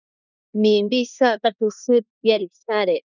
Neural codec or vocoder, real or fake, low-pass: codec, 16 kHz in and 24 kHz out, 0.9 kbps, LongCat-Audio-Codec, four codebook decoder; fake; 7.2 kHz